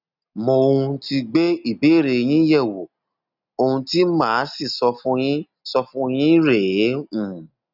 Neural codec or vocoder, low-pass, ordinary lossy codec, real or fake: none; 5.4 kHz; none; real